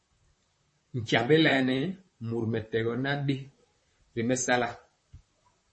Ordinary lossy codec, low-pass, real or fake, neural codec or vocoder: MP3, 32 kbps; 10.8 kHz; fake; vocoder, 44.1 kHz, 128 mel bands, Pupu-Vocoder